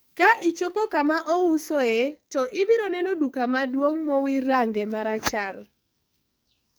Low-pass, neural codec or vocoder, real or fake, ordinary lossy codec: none; codec, 44.1 kHz, 2.6 kbps, SNAC; fake; none